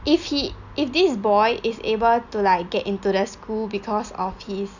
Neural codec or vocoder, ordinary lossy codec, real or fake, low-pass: none; none; real; 7.2 kHz